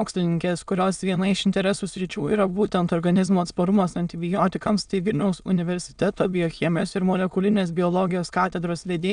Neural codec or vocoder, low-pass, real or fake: autoencoder, 22.05 kHz, a latent of 192 numbers a frame, VITS, trained on many speakers; 9.9 kHz; fake